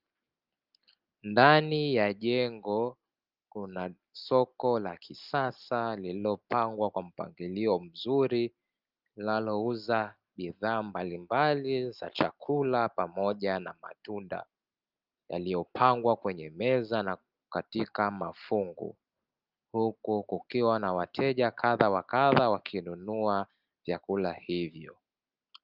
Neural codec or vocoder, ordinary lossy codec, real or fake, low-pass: none; Opus, 24 kbps; real; 5.4 kHz